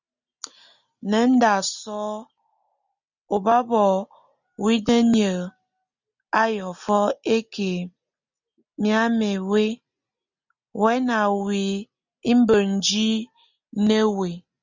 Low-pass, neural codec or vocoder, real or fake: 7.2 kHz; none; real